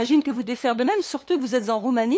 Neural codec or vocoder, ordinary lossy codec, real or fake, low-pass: codec, 16 kHz, 2 kbps, FunCodec, trained on LibriTTS, 25 frames a second; none; fake; none